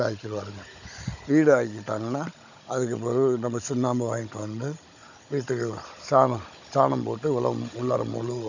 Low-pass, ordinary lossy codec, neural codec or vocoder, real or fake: 7.2 kHz; none; codec, 16 kHz, 16 kbps, FunCodec, trained on Chinese and English, 50 frames a second; fake